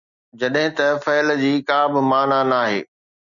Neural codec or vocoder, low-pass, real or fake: none; 7.2 kHz; real